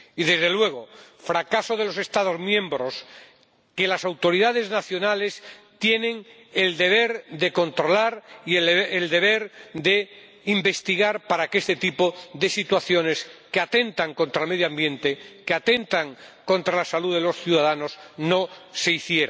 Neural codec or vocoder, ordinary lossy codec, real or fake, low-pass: none; none; real; none